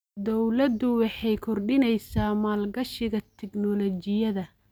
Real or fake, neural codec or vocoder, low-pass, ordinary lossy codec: real; none; none; none